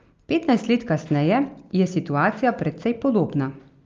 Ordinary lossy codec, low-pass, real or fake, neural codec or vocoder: Opus, 32 kbps; 7.2 kHz; real; none